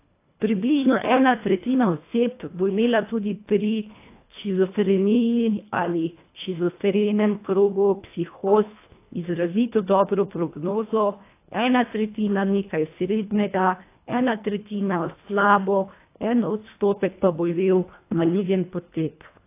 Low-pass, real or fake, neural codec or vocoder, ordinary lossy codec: 3.6 kHz; fake; codec, 24 kHz, 1.5 kbps, HILCodec; AAC, 24 kbps